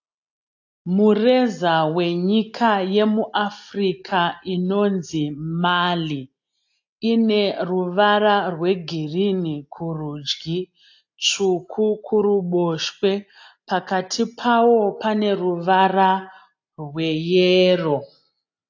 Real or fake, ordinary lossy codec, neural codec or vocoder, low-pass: real; AAC, 48 kbps; none; 7.2 kHz